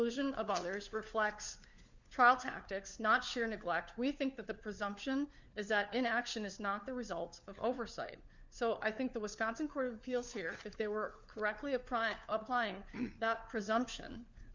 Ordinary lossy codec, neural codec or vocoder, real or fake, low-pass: Opus, 64 kbps; codec, 16 kHz, 4 kbps, FunCodec, trained on LibriTTS, 50 frames a second; fake; 7.2 kHz